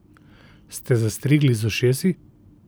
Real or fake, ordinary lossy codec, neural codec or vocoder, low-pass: real; none; none; none